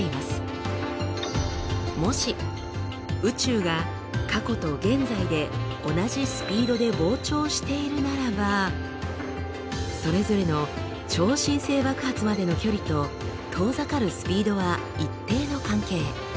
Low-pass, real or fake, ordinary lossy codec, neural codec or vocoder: none; real; none; none